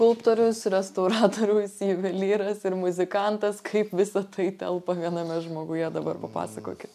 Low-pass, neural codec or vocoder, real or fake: 14.4 kHz; none; real